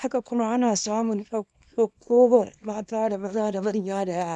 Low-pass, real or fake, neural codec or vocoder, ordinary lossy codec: none; fake; codec, 24 kHz, 0.9 kbps, WavTokenizer, small release; none